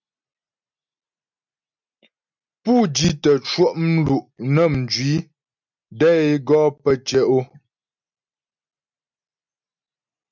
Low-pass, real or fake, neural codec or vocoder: 7.2 kHz; real; none